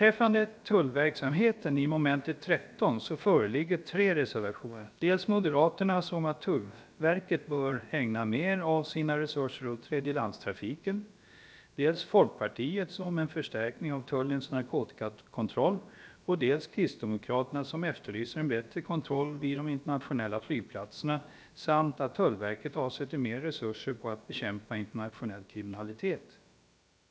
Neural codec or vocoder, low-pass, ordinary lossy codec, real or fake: codec, 16 kHz, about 1 kbps, DyCAST, with the encoder's durations; none; none; fake